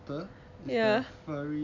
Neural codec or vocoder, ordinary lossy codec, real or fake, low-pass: none; MP3, 64 kbps; real; 7.2 kHz